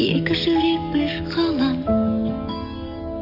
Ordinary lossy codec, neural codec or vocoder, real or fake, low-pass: none; codec, 44.1 kHz, 7.8 kbps, DAC; fake; 5.4 kHz